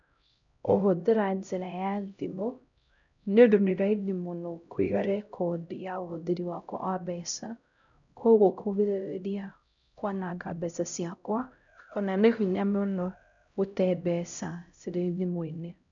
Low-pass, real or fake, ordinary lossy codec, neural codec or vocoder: 7.2 kHz; fake; none; codec, 16 kHz, 0.5 kbps, X-Codec, HuBERT features, trained on LibriSpeech